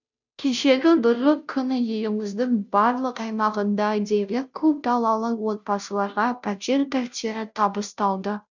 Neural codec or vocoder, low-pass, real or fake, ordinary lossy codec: codec, 16 kHz, 0.5 kbps, FunCodec, trained on Chinese and English, 25 frames a second; 7.2 kHz; fake; none